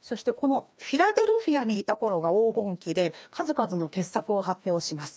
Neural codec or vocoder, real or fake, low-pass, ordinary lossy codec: codec, 16 kHz, 1 kbps, FreqCodec, larger model; fake; none; none